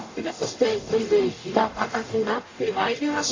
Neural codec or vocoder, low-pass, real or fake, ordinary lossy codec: codec, 44.1 kHz, 0.9 kbps, DAC; 7.2 kHz; fake; AAC, 32 kbps